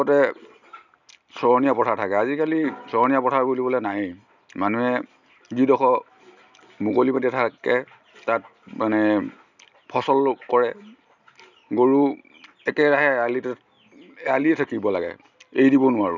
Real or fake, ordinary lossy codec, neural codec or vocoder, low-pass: real; none; none; 7.2 kHz